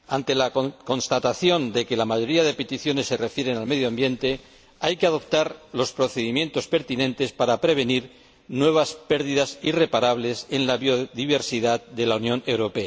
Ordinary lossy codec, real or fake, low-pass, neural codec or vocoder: none; real; none; none